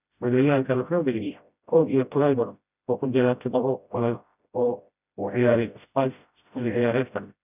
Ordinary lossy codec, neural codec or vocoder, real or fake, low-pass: none; codec, 16 kHz, 0.5 kbps, FreqCodec, smaller model; fake; 3.6 kHz